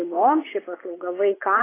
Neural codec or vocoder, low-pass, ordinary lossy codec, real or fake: vocoder, 24 kHz, 100 mel bands, Vocos; 3.6 kHz; AAC, 16 kbps; fake